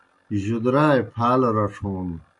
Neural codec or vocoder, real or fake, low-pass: none; real; 10.8 kHz